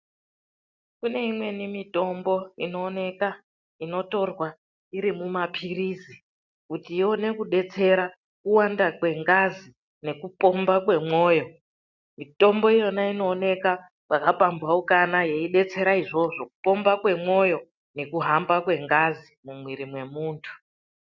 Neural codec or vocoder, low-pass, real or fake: none; 7.2 kHz; real